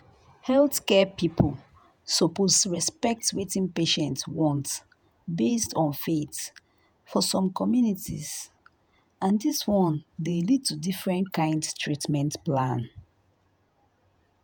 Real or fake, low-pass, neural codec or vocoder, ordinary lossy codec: fake; none; vocoder, 48 kHz, 128 mel bands, Vocos; none